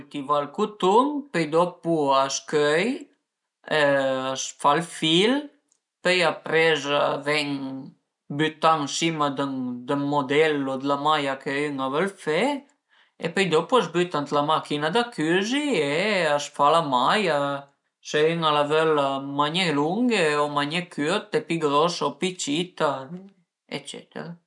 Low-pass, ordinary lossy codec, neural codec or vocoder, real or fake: 10.8 kHz; none; none; real